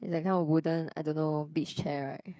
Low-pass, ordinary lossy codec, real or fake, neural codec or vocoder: none; none; fake; codec, 16 kHz, 8 kbps, FreqCodec, smaller model